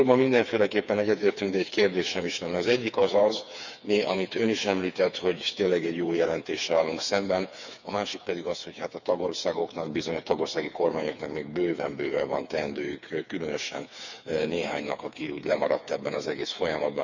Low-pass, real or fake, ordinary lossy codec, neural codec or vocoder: 7.2 kHz; fake; none; codec, 16 kHz, 4 kbps, FreqCodec, smaller model